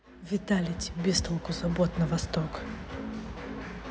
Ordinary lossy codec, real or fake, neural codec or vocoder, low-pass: none; real; none; none